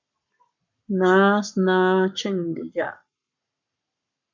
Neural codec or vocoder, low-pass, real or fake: codec, 44.1 kHz, 7.8 kbps, Pupu-Codec; 7.2 kHz; fake